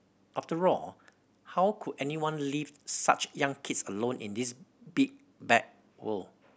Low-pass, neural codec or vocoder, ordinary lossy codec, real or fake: none; none; none; real